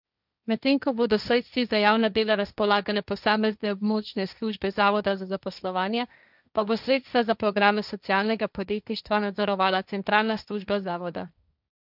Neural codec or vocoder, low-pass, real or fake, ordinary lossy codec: codec, 16 kHz, 1.1 kbps, Voila-Tokenizer; 5.4 kHz; fake; none